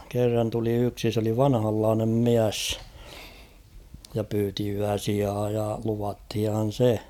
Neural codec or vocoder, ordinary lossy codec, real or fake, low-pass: none; none; real; 19.8 kHz